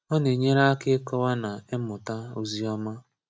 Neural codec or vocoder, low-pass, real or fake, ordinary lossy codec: none; none; real; none